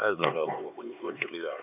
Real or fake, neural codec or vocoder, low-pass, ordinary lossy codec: fake; codec, 16 kHz, 4 kbps, X-Codec, HuBERT features, trained on LibriSpeech; 3.6 kHz; none